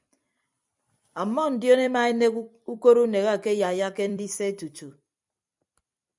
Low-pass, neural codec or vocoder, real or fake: 10.8 kHz; vocoder, 44.1 kHz, 128 mel bands every 256 samples, BigVGAN v2; fake